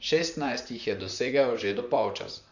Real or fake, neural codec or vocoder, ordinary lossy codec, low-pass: fake; vocoder, 44.1 kHz, 128 mel bands, Pupu-Vocoder; none; 7.2 kHz